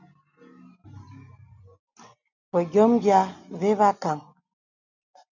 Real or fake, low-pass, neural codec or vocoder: real; 7.2 kHz; none